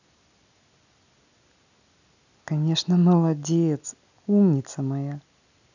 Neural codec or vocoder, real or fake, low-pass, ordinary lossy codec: none; real; 7.2 kHz; none